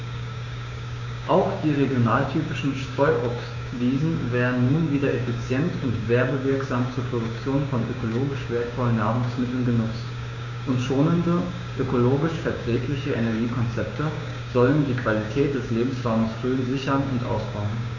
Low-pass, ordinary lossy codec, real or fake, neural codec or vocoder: 7.2 kHz; none; fake; codec, 16 kHz, 6 kbps, DAC